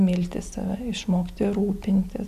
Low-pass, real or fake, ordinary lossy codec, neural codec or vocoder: 14.4 kHz; real; AAC, 96 kbps; none